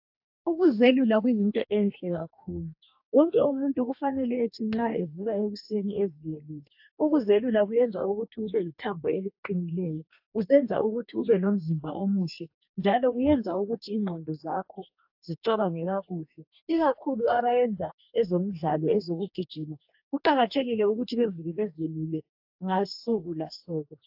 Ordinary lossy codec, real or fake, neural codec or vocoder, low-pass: AAC, 48 kbps; fake; codec, 44.1 kHz, 2.6 kbps, DAC; 5.4 kHz